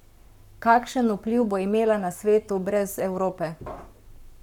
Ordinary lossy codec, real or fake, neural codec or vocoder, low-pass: none; fake; codec, 44.1 kHz, 7.8 kbps, Pupu-Codec; 19.8 kHz